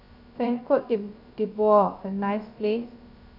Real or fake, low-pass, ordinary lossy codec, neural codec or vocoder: fake; 5.4 kHz; none; codec, 16 kHz, 0.3 kbps, FocalCodec